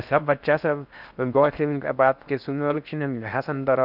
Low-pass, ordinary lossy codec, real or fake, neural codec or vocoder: 5.4 kHz; none; fake; codec, 16 kHz in and 24 kHz out, 0.6 kbps, FocalCodec, streaming, 4096 codes